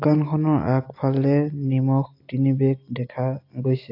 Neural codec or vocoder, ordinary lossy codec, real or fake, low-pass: none; AAC, 32 kbps; real; 5.4 kHz